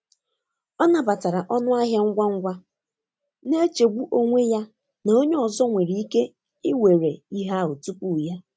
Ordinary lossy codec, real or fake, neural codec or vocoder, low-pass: none; real; none; none